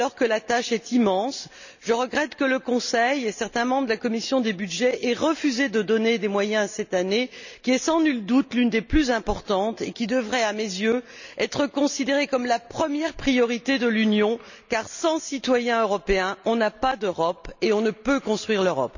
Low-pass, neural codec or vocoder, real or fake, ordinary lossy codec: 7.2 kHz; none; real; none